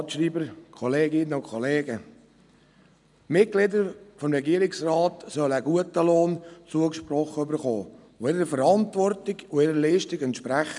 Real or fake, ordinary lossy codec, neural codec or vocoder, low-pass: real; none; none; 10.8 kHz